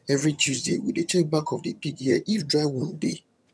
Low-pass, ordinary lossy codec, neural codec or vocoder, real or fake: none; none; vocoder, 22.05 kHz, 80 mel bands, HiFi-GAN; fake